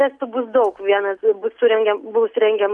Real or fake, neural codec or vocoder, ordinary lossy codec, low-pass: real; none; MP3, 64 kbps; 10.8 kHz